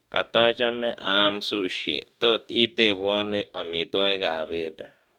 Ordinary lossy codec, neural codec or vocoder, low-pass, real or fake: none; codec, 44.1 kHz, 2.6 kbps, DAC; 19.8 kHz; fake